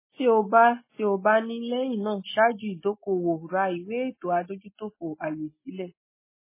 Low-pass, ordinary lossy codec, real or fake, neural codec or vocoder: 3.6 kHz; MP3, 16 kbps; real; none